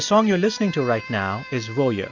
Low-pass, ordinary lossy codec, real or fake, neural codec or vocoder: 7.2 kHz; AAC, 48 kbps; real; none